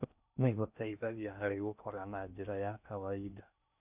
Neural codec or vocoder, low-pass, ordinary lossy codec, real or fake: codec, 16 kHz in and 24 kHz out, 0.6 kbps, FocalCodec, streaming, 4096 codes; 3.6 kHz; none; fake